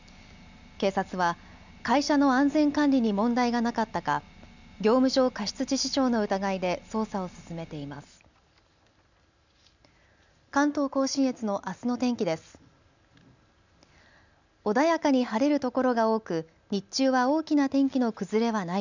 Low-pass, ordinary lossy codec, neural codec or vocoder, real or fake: 7.2 kHz; none; none; real